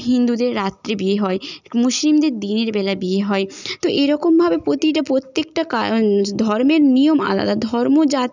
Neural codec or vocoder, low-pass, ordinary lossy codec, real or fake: none; 7.2 kHz; none; real